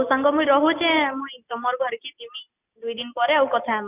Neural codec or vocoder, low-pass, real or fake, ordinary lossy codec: none; 3.6 kHz; real; none